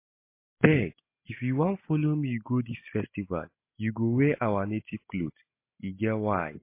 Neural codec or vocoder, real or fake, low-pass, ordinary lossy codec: none; real; 3.6 kHz; MP3, 24 kbps